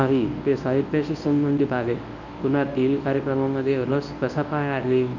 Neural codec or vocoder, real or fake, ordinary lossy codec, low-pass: codec, 24 kHz, 0.9 kbps, WavTokenizer, medium speech release version 1; fake; none; 7.2 kHz